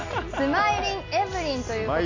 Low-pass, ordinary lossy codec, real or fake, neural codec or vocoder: 7.2 kHz; AAC, 48 kbps; real; none